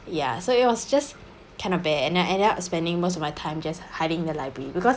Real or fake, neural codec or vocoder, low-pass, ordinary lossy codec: real; none; none; none